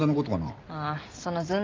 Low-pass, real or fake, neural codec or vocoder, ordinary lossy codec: 7.2 kHz; real; none; Opus, 24 kbps